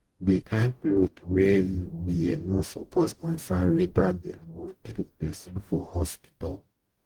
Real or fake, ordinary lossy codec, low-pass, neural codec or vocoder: fake; Opus, 24 kbps; 19.8 kHz; codec, 44.1 kHz, 0.9 kbps, DAC